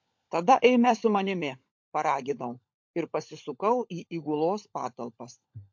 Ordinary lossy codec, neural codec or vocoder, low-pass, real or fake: MP3, 48 kbps; codec, 16 kHz, 16 kbps, FunCodec, trained on LibriTTS, 50 frames a second; 7.2 kHz; fake